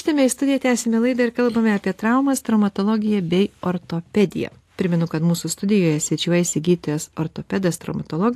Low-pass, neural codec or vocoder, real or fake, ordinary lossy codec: 14.4 kHz; none; real; AAC, 64 kbps